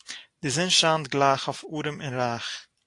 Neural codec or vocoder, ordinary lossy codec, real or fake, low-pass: none; AAC, 48 kbps; real; 10.8 kHz